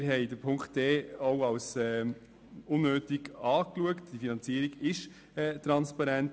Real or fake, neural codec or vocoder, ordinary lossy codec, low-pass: real; none; none; none